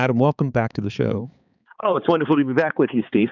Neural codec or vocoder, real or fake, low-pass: codec, 16 kHz, 4 kbps, X-Codec, HuBERT features, trained on balanced general audio; fake; 7.2 kHz